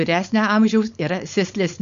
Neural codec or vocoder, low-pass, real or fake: codec, 16 kHz, 4.8 kbps, FACodec; 7.2 kHz; fake